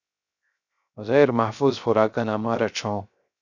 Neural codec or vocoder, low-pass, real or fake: codec, 16 kHz, 0.3 kbps, FocalCodec; 7.2 kHz; fake